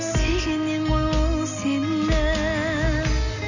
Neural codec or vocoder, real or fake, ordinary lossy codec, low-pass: none; real; none; 7.2 kHz